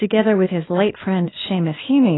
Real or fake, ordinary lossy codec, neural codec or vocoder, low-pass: fake; AAC, 16 kbps; codec, 16 kHz, 0.8 kbps, ZipCodec; 7.2 kHz